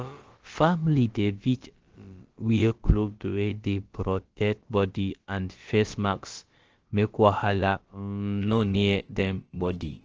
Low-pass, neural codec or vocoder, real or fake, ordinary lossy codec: 7.2 kHz; codec, 16 kHz, about 1 kbps, DyCAST, with the encoder's durations; fake; Opus, 16 kbps